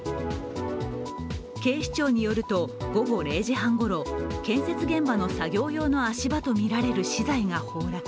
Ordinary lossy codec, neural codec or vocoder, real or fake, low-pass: none; none; real; none